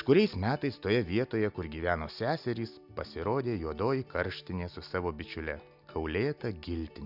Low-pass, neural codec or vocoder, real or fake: 5.4 kHz; none; real